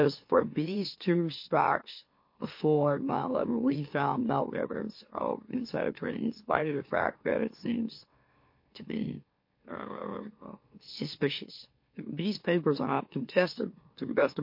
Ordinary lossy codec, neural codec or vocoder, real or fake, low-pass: MP3, 32 kbps; autoencoder, 44.1 kHz, a latent of 192 numbers a frame, MeloTTS; fake; 5.4 kHz